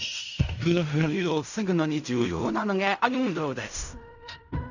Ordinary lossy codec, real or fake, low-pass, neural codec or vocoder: none; fake; 7.2 kHz; codec, 16 kHz in and 24 kHz out, 0.4 kbps, LongCat-Audio-Codec, fine tuned four codebook decoder